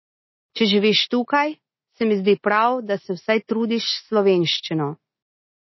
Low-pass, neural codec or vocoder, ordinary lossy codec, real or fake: 7.2 kHz; none; MP3, 24 kbps; real